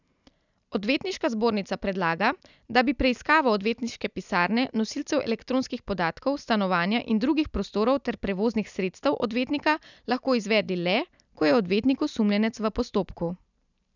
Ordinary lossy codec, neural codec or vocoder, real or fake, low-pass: none; none; real; 7.2 kHz